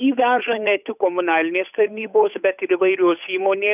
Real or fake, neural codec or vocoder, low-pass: fake; codec, 16 kHz, 8 kbps, FunCodec, trained on Chinese and English, 25 frames a second; 3.6 kHz